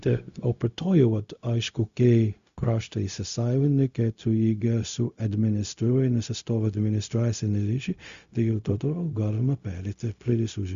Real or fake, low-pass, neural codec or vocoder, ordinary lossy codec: fake; 7.2 kHz; codec, 16 kHz, 0.4 kbps, LongCat-Audio-Codec; Opus, 64 kbps